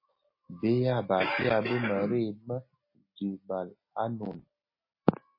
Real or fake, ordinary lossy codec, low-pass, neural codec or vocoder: real; MP3, 24 kbps; 5.4 kHz; none